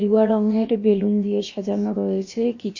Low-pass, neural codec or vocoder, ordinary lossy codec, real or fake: 7.2 kHz; codec, 16 kHz, about 1 kbps, DyCAST, with the encoder's durations; MP3, 32 kbps; fake